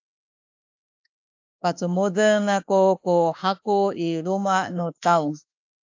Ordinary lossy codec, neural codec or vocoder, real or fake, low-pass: AAC, 48 kbps; autoencoder, 48 kHz, 32 numbers a frame, DAC-VAE, trained on Japanese speech; fake; 7.2 kHz